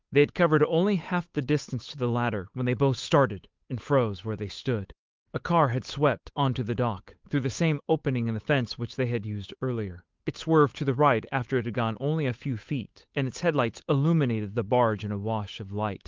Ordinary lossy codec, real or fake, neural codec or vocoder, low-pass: Opus, 24 kbps; fake; codec, 16 kHz, 8 kbps, FunCodec, trained on Chinese and English, 25 frames a second; 7.2 kHz